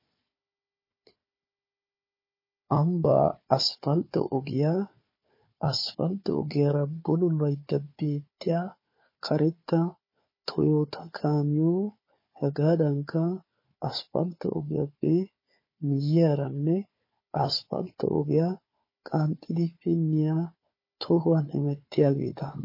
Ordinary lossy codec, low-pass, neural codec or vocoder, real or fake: MP3, 24 kbps; 5.4 kHz; codec, 16 kHz, 4 kbps, FunCodec, trained on Chinese and English, 50 frames a second; fake